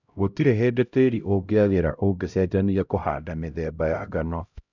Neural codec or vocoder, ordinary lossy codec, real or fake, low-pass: codec, 16 kHz, 0.5 kbps, X-Codec, HuBERT features, trained on LibriSpeech; none; fake; 7.2 kHz